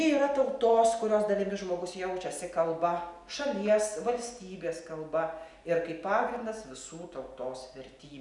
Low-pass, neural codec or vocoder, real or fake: 10.8 kHz; none; real